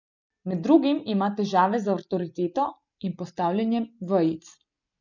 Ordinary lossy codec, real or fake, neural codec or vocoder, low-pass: none; real; none; 7.2 kHz